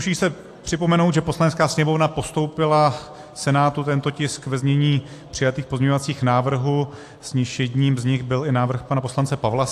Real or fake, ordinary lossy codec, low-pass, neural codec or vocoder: real; AAC, 64 kbps; 14.4 kHz; none